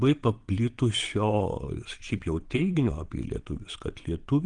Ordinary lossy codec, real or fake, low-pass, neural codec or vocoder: Opus, 24 kbps; fake; 9.9 kHz; vocoder, 22.05 kHz, 80 mel bands, WaveNeXt